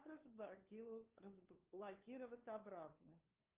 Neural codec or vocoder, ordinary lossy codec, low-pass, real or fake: codec, 16 kHz, 2 kbps, FunCodec, trained on LibriTTS, 25 frames a second; Opus, 24 kbps; 3.6 kHz; fake